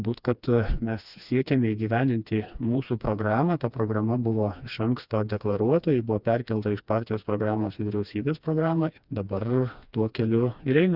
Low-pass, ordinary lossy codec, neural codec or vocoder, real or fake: 5.4 kHz; Opus, 64 kbps; codec, 16 kHz, 2 kbps, FreqCodec, smaller model; fake